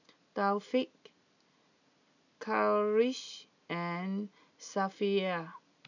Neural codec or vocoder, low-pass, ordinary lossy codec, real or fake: none; 7.2 kHz; none; real